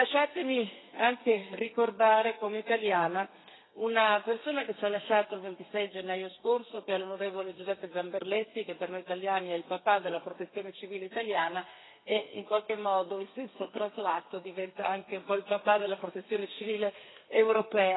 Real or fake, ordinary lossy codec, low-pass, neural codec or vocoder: fake; AAC, 16 kbps; 7.2 kHz; codec, 32 kHz, 1.9 kbps, SNAC